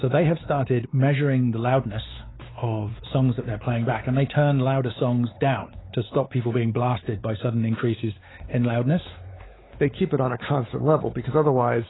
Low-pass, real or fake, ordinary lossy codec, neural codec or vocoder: 7.2 kHz; fake; AAC, 16 kbps; codec, 16 kHz, 4 kbps, X-Codec, WavLM features, trained on Multilingual LibriSpeech